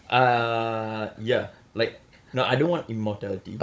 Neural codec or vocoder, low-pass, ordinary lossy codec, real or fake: codec, 16 kHz, 16 kbps, FunCodec, trained on Chinese and English, 50 frames a second; none; none; fake